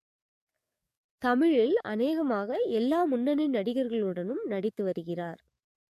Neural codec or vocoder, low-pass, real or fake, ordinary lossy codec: codec, 44.1 kHz, 7.8 kbps, DAC; 14.4 kHz; fake; MP3, 64 kbps